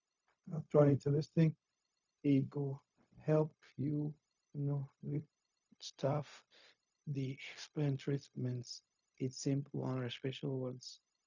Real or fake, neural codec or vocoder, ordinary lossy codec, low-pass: fake; codec, 16 kHz, 0.4 kbps, LongCat-Audio-Codec; none; 7.2 kHz